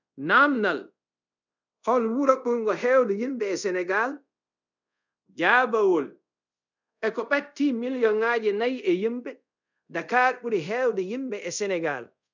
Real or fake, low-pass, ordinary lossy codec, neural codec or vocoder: fake; 7.2 kHz; none; codec, 24 kHz, 0.5 kbps, DualCodec